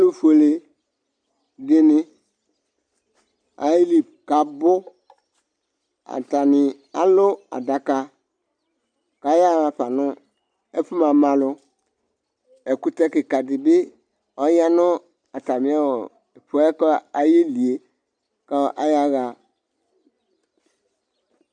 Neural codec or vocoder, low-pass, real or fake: none; 9.9 kHz; real